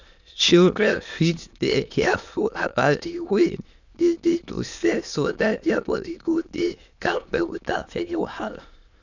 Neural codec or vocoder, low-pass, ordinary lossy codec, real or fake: autoencoder, 22.05 kHz, a latent of 192 numbers a frame, VITS, trained on many speakers; 7.2 kHz; none; fake